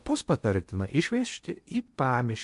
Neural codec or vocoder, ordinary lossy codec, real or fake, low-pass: codec, 16 kHz in and 24 kHz out, 0.8 kbps, FocalCodec, streaming, 65536 codes; MP3, 64 kbps; fake; 10.8 kHz